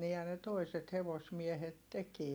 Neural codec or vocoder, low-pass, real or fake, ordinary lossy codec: none; none; real; none